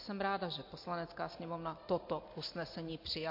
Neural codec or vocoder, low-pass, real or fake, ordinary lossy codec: none; 5.4 kHz; real; MP3, 32 kbps